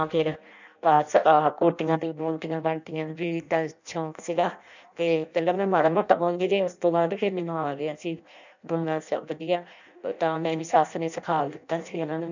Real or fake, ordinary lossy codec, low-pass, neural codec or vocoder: fake; none; 7.2 kHz; codec, 16 kHz in and 24 kHz out, 0.6 kbps, FireRedTTS-2 codec